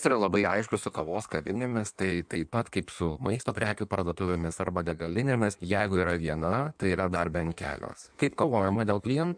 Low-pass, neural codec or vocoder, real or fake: 9.9 kHz; codec, 16 kHz in and 24 kHz out, 1.1 kbps, FireRedTTS-2 codec; fake